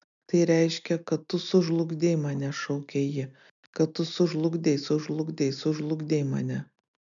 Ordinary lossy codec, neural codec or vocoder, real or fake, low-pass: MP3, 96 kbps; none; real; 7.2 kHz